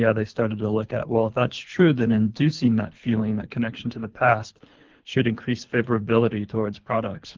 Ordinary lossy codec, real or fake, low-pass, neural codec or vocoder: Opus, 32 kbps; fake; 7.2 kHz; codec, 24 kHz, 3 kbps, HILCodec